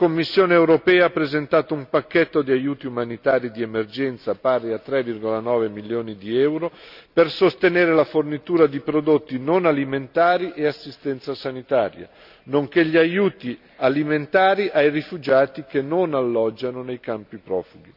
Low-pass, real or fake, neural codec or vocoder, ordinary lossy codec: 5.4 kHz; real; none; none